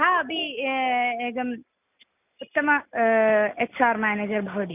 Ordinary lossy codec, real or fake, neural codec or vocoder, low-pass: none; real; none; 3.6 kHz